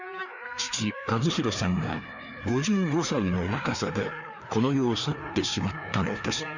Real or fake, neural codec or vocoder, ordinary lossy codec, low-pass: fake; codec, 16 kHz, 2 kbps, FreqCodec, larger model; none; 7.2 kHz